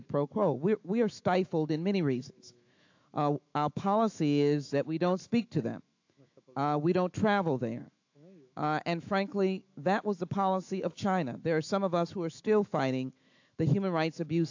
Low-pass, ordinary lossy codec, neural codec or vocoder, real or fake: 7.2 kHz; AAC, 48 kbps; autoencoder, 48 kHz, 128 numbers a frame, DAC-VAE, trained on Japanese speech; fake